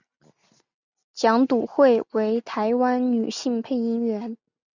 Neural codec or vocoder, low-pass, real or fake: none; 7.2 kHz; real